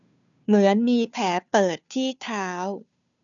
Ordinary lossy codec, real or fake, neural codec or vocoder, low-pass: none; fake; codec, 16 kHz, 2 kbps, FunCodec, trained on Chinese and English, 25 frames a second; 7.2 kHz